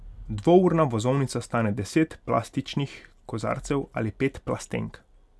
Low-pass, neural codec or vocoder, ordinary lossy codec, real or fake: none; none; none; real